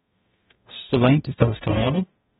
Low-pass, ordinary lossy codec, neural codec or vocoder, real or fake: 19.8 kHz; AAC, 16 kbps; codec, 44.1 kHz, 0.9 kbps, DAC; fake